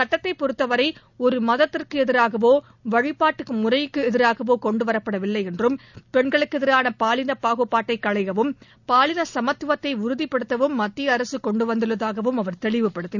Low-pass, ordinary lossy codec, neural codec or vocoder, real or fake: 7.2 kHz; none; none; real